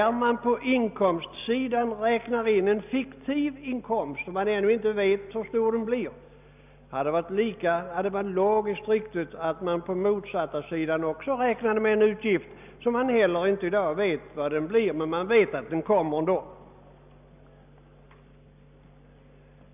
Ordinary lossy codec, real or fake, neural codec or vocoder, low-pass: none; real; none; 3.6 kHz